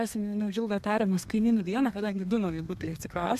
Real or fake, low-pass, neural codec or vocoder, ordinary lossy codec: fake; 14.4 kHz; codec, 32 kHz, 1.9 kbps, SNAC; MP3, 96 kbps